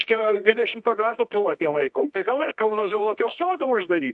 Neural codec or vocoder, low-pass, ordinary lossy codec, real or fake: codec, 24 kHz, 0.9 kbps, WavTokenizer, medium music audio release; 10.8 kHz; MP3, 64 kbps; fake